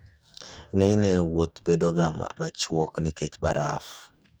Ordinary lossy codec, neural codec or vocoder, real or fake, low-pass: none; codec, 44.1 kHz, 2.6 kbps, DAC; fake; none